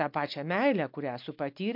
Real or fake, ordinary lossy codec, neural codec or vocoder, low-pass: real; MP3, 48 kbps; none; 5.4 kHz